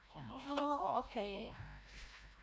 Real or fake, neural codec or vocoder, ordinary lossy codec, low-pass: fake; codec, 16 kHz, 0.5 kbps, FreqCodec, larger model; none; none